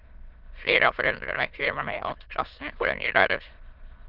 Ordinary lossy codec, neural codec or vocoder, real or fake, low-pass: Opus, 32 kbps; autoencoder, 22.05 kHz, a latent of 192 numbers a frame, VITS, trained on many speakers; fake; 5.4 kHz